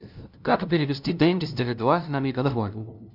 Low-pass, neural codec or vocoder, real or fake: 5.4 kHz; codec, 16 kHz, 0.5 kbps, FunCodec, trained on LibriTTS, 25 frames a second; fake